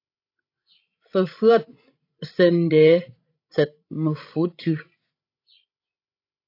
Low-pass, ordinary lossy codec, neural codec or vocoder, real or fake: 5.4 kHz; AAC, 32 kbps; codec, 16 kHz, 16 kbps, FreqCodec, larger model; fake